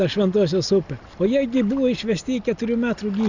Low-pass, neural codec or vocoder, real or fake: 7.2 kHz; none; real